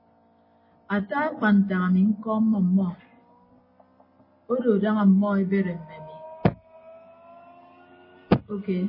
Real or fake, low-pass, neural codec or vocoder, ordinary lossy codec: real; 5.4 kHz; none; MP3, 24 kbps